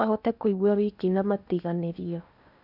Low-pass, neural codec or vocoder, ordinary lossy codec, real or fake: 5.4 kHz; codec, 16 kHz in and 24 kHz out, 0.8 kbps, FocalCodec, streaming, 65536 codes; none; fake